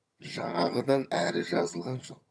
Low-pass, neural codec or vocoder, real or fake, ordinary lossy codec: none; vocoder, 22.05 kHz, 80 mel bands, HiFi-GAN; fake; none